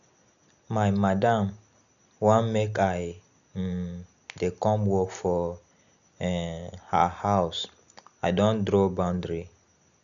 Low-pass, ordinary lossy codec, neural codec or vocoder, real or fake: 7.2 kHz; none; none; real